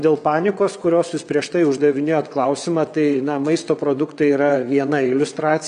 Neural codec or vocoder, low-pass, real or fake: vocoder, 22.05 kHz, 80 mel bands, WaveNeXt; 9.9 kHz; fake